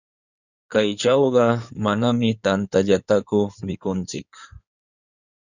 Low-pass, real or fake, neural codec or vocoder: 7.2 kHz; fake; codec, 16 kHz in and 24 kHz out, 2.2 kbps, FireRedTTS-2 codec